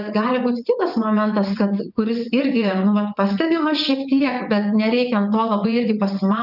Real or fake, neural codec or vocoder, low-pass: fake; vocoder, 22.05 kHz, 80 mel bands, WaveNeXt; 5.4 kHz